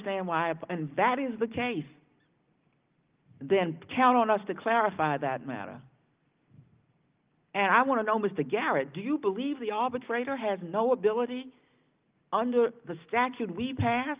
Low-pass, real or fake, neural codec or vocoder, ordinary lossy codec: 3.6 kHz; real; none; Opus, 32 kbps